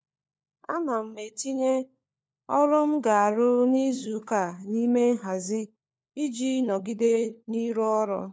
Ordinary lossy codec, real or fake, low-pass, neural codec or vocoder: none; fake; none; codec, 16 kHz, 4 kbps, FunCodec, trained on LibriTTS, 50 frames a second